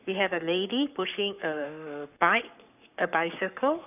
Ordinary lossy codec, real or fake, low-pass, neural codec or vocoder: none; fake; 3.6 kHz; codec, 44.1 kHz, 7.8 kbps, Pupu-Codec